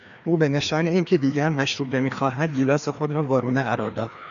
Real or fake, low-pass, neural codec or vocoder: fake; 7.2 kHz; codec, 16 kHz, 2 kbps, FreqCodec, larger model